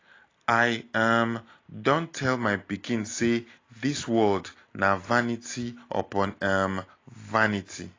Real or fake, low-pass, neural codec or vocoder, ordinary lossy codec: real; 7.2 kHz; none; AAC, 32 kbps